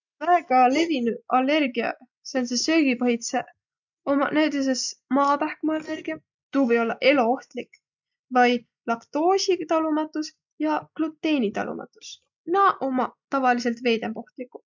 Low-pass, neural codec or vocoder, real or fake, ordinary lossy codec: 7.2 kHz; none; real; none